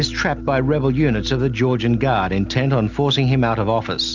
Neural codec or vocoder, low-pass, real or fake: none; 7.2 kHz; real